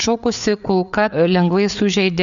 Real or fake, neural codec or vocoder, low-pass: fake; codec, 16 kHz, 4 kbps, FreqCodec, larger model; 7.2 kHz